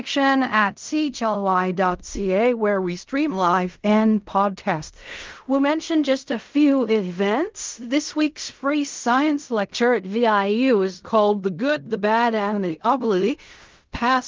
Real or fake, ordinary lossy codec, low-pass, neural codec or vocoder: fake; Opus, 32 kbps; 7.2 kHz; codec, 16 kHz in and 24 kHz out, 0.4 kbps, LongCat-Audio-Codec, fine tuned four codebook decoder